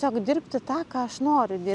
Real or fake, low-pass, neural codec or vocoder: real; 10.8 kHz; none